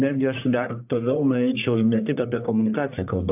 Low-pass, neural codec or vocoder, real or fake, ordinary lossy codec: 3.6 kHz; codec, 44.1 kHz, 1.7 kbps, Pupu-Codec; fake; AAC, 32 kbps